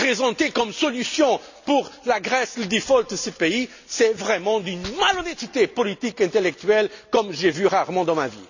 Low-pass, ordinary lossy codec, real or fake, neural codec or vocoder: 7.2 kHz; none; real; none